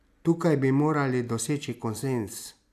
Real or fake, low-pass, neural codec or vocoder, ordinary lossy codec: real; 14.4 kHz; none; none